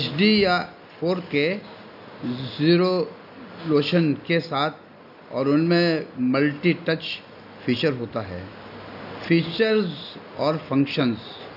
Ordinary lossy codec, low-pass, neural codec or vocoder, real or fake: none; 5.4 kHz; none; real